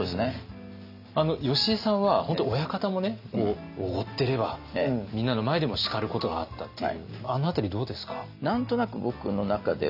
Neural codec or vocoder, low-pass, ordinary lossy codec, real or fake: none; 5.4 kHz; none; real